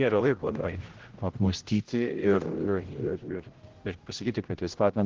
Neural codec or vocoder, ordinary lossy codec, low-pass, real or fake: codec, 16 kHz, 0.5 kbps, X-Codec, HuBERT features, trained on general audio; Opus, 16 kbps; 7.2 kHz; fake